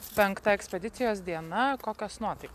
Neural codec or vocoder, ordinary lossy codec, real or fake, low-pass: none; AAC, 96 kbps; real; 14.4 kHz